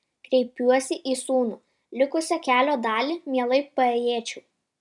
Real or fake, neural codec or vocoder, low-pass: real; none; 10.8 kHz